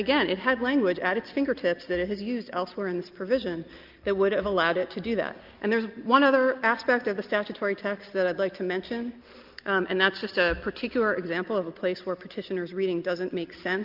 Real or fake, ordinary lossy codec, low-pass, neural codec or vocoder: real; Opus, 24 kbps; 5.4 kHz; none